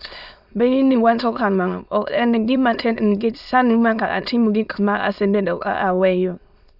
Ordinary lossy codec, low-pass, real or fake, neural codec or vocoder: none; 5.4 kHz; fake; autoencoder, 22.05 kHz, a latent of 192 numbers a frame, VITS, trained on many speakers